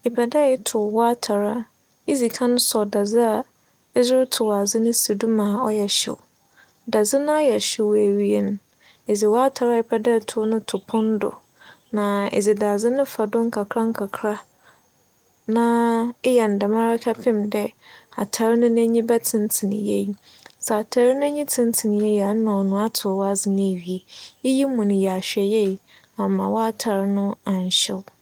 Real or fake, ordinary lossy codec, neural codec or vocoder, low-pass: real; Opus, 32 kbps; none; 19.8 kHz